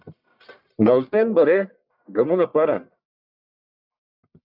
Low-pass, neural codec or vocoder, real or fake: 5.4 kHz; codec, 44.1 kHz, 1.7 kbps, Pupu-Codec; fake